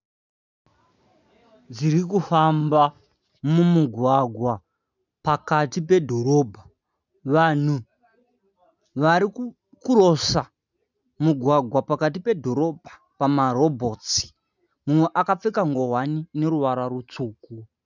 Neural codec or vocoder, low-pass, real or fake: none; 7.2 kHz; real